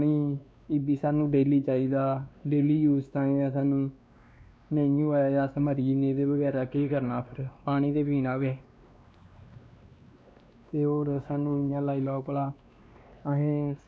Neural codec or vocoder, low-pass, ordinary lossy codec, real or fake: codec, 16 kHz, 2 kbps, X-Codec, WavLM features, trained on Multilingual LibriSpeech; none; none; fake